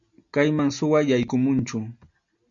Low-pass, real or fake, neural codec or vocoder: 7.2 kHz; real; none